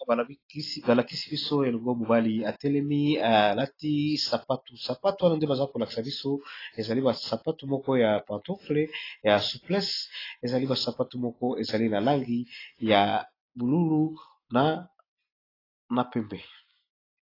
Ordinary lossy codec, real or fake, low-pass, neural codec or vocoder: AAC, 24 kbps; real; 5.4 kHz; none